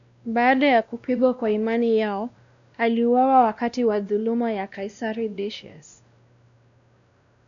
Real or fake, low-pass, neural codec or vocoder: fake; 7.2 kHz; codec, 16 kHz, 1 kbps, X-Codec, WavLM features, trained on Multilingual LibriSpeech